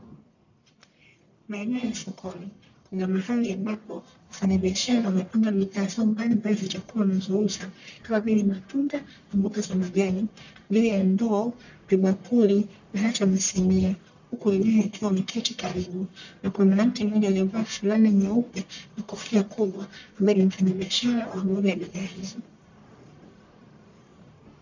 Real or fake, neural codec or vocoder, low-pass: fake; codec, 44.1 kHz, 1.7 kbps, Pupu-Codec; 7.2 kHz